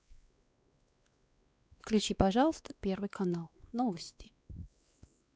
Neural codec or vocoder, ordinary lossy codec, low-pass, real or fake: codec, 16 kHz, 2 kbps, X-Codec, WavLM features, trained on Multilingual LibriSpeech; none; none; fake